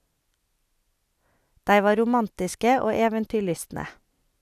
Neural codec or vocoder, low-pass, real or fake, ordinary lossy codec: none; 14.4 kHz; real; none